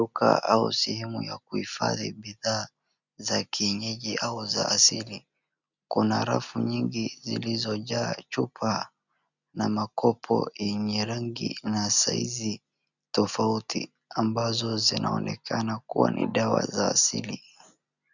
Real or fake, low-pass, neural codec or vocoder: real; 7.2 kHz; none